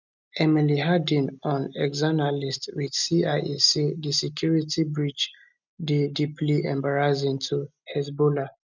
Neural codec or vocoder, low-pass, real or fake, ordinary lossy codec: none; 7.2 kHz; real; none